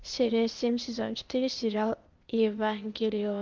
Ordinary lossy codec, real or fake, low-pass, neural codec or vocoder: Opus, 32 kbps; fake; 7.2 kHz; codec, 16 kHz, 0.8 kbps, ZipCodec